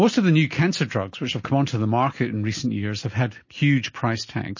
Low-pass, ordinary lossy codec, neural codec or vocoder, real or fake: 7.2 kHz; MP3, 32 kbps; none; real